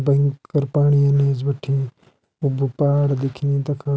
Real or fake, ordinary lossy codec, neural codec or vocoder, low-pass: real; none; none; none